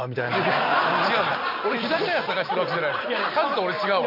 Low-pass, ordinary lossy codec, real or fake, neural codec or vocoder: 5.4 kHz; MP3, 48 kbps; real; none